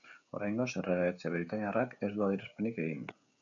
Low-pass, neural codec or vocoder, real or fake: 7.2 kHz; codec, 16 kHz, 16 kbps, FreqCodec, smaller model; fake